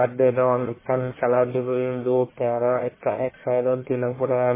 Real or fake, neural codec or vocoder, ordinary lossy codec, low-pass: fake; codec, 44.1 kHz, 1.7 kbps, Pupu-Codec; MP3, 16 kbps; 3.6 kHz